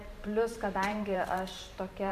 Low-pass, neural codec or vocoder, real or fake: 14.4 kHz; vocoder, 44.1 kHz, 128 mel bands every 512 samples, BigVGAN v2; fake